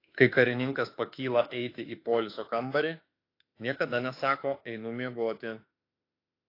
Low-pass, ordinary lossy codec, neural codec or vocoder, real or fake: 5.4 kHz; AAC, 32 kbps; autoencoder, 48 kHz, 32 numbers a frame, DAC-VAE, trained on Japanese speech; fake